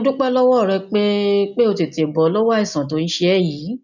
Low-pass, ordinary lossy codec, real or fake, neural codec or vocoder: 7.2 kHz; none; real; none